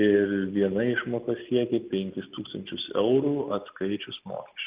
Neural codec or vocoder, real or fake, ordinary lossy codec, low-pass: none; real; Opus, 24 kbps; 3.6 kHz